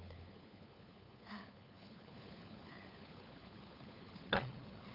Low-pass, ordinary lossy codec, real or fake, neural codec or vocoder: 5.4 kHz; none; fake; codec, 16 kHz, 4 kbps, FunCodec, trained on LibriTTS, 50 frames a second